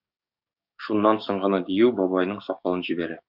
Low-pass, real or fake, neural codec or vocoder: 5.4 kHz; fake; codec, 44.1 kHz, 7.8 kbps, DAC